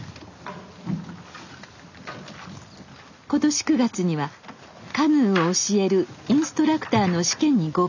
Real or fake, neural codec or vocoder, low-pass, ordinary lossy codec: real; none; 7.2 kHz; none